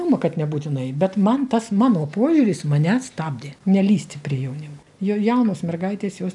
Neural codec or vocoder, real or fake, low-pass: none; real; 10.8 kHz